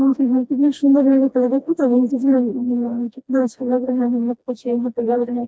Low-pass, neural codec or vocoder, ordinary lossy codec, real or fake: none; codec, 16 kHz, 1 kbps, FreqCodec, smaller model; none; fake